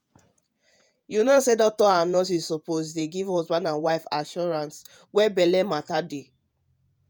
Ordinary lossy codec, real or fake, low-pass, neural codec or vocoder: none; fake; none; vocoder, 48 kHz, 128 mel bands, Vocos